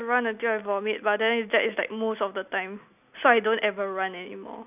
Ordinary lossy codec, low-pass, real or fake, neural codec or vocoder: none; 3.6 kHz; real; none